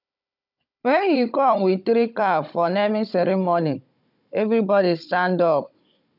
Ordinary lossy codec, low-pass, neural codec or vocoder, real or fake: none; 5.4 kHz; codec, 16 kHz, 16 kbps, FunCodec, trained on Chinese and English, 50 frames a second; fake